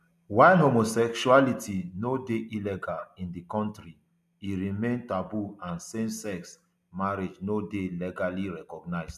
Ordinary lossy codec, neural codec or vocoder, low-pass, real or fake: none; none; 14.4 kHz; real